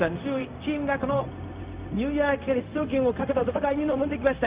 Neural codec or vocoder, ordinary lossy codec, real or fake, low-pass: codec, 16 kHz, 0.4 kbps, LongCat-Audio-Codec; Opus, 24 kbps; fake; 3.6 kHz